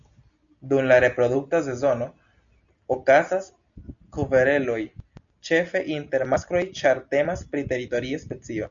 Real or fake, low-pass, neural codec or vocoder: real; 7.2 kHz; none